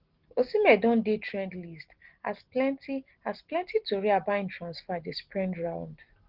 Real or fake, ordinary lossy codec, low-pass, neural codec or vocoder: real; Opus, 16 kbps; 5.4 kHz; none